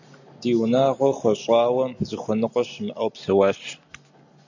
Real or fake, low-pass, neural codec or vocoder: real; 7.2 kHz; none